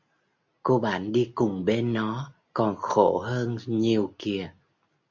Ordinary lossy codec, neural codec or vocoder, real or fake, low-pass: MP3, 64 kbps; none; real; 7.2 kHz